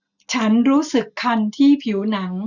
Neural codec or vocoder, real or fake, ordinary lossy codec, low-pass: none; real; none; 7.2 kHz